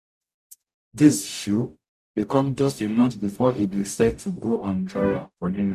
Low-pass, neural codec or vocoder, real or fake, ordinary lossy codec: 14.4 kHz; codec, 44.1 kHz, 0.9 kbps, DAC; fake; none